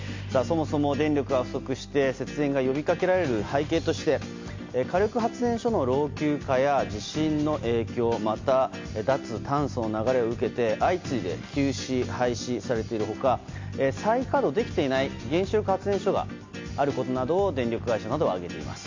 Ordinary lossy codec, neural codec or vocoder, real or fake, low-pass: MP3, 48 kbps; none; real; 7.2 kHz